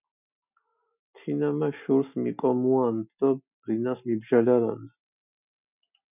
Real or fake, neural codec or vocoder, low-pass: real; none; 3.6 kHz